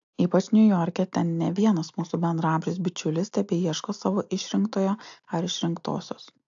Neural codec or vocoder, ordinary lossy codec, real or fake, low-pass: none; AAC, 64 kbps; real; 7.2 kHz